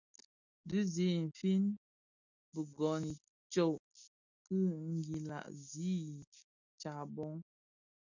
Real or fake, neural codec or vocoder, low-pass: real; none; 7.2 kHz